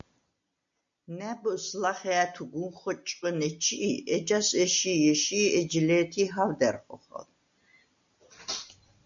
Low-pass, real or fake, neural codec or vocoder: 7.2 kHz; real; none